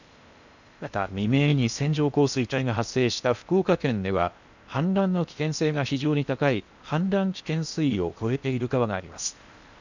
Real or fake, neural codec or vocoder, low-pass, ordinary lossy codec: fake; codec, 16 kHz in and 24 kHz out, 0.8 kbps, FocalCodec, streaming, 65536 codes; 7.2 kHz; none